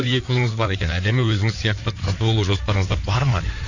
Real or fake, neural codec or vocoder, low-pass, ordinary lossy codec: fake; codec, 16 kHz in and 24 kHz out, 2.2 kbps, FireRedTTS-2 codec; 7.2 kHz; none